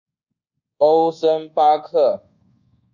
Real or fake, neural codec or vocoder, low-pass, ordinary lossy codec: fake; codec, 24 kHz, 1.2 kbps, DualCodec; 7.2 kHz; Opus, 64 kbps